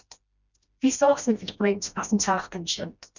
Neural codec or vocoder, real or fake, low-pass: codec, 16 kHz, 1 kbps, FreqCodec, smaller model; fake; 7.2 kHz